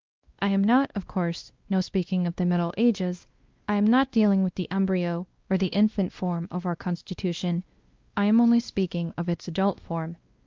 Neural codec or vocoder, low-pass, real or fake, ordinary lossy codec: codec, 16 kHz, 1 kbps, X-Codec, WavLM features, trained on Multilingual LibriSpeech; 7.2 kHz; fake; Opus, 32 kbps